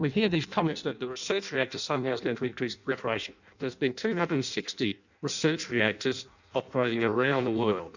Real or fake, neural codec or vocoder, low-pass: fake; codec, 16 kHz in and 24 kHz out, 0.6 kbps, FireRedTTS-2 codec; 7.2 kHz